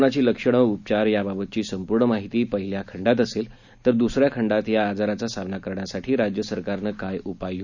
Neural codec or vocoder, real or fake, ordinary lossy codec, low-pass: none; real; none; 7.2 kHz